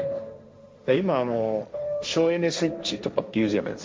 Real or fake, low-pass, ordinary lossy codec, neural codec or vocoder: fake; none; none; codec, 16 kHz, 1.1 kbps, Voila-Tokenizer